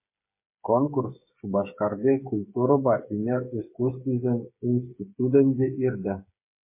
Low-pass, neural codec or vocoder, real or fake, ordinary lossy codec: 3.6 kHz; codec, 16 kHz, 8 kbps, FreqCodec, smaller model; fake; MP3, 24 kbps